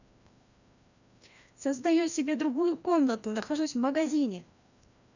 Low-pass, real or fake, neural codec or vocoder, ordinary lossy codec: 7.2 kHz; fake; codec, 16 kHz, 1 kbps, FreqCodec, larger model; none